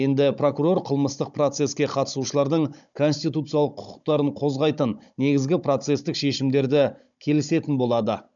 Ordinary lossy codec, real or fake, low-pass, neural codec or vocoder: none; fake; 7.2 kHz; codec, 16 kHz, 16 kbps, FunCodec, trained on Chinese and English, 50 frames a second